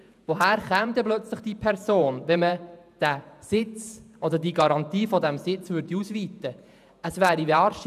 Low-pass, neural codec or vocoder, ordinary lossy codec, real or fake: 14.4 kHz; vocoder, 48 kHz, 128 mel bands, Vocos; none; fake